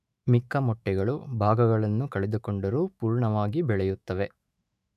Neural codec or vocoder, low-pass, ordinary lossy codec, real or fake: autoencoder, 48 kHz, 128 numbers a frame, DAC-VAE, trained on Japanese speech; 14.4 kHz; none; fake